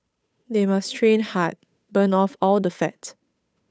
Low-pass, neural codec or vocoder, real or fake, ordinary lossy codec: none; codec, 16 kHz, 8 kbps, FunCodec, trained on Chinese and English, 25 frames a second; fake; none